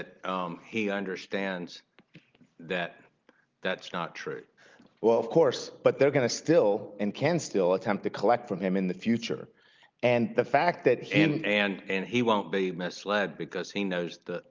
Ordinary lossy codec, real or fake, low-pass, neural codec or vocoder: Opus, 24 kbps; real; 7.2 kHz; none